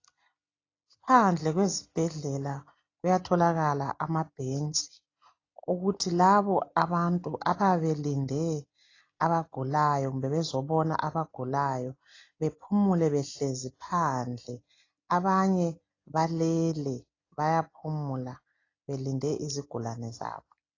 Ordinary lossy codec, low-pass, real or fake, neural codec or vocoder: AAC, 32 kbps; 7.2 kHz; real; none